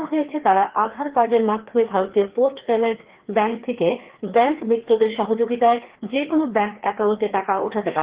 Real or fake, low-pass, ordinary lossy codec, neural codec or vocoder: fake; 3.6 kHz; Opus, 16 kbps; codec, 16 kHz, 2 kbps, FreqCodec, larger model